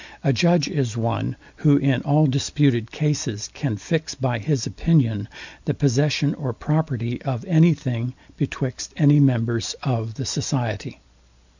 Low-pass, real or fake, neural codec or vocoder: 7.2 kHz; real; none